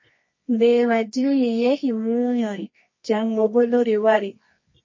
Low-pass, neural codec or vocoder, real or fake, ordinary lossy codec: 7.2 kHz; codec, 24 kHz, 0.9 kbps, WavTokenizer, medium music audio release; fake; MP3, 32 kbps